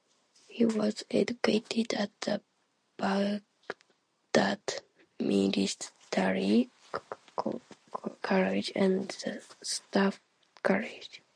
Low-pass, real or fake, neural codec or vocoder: 9.9 kHz; real; none